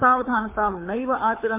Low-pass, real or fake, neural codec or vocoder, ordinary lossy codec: 3.6 kHz; fake; codec, 24 kHz, 6 kbps, HILCodec; MP3, 24 kbps